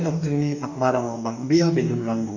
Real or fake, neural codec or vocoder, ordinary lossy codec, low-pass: fake; codec, 44.1 kHz, 2.6 kbps, DAC; none; 7.2 kHz